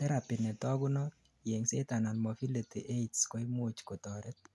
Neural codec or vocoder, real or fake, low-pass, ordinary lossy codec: none; real; none; none